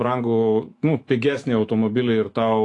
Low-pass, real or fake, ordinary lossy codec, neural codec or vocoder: 10.8 kHz; fake; AAC, 48 kbps; autoencoder, 48 kHz, 128 numbers a frame, DAC-VAE, trained on Japanese speech